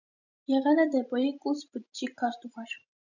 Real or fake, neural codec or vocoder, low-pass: fake; vocoder, 44.1 kHz, 128 mel bands every 512 samples, BigVGAN v2; 7.2 kHz